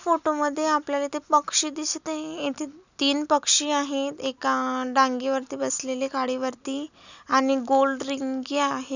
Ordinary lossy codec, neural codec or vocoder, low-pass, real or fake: none; none; 7.2 kHz; real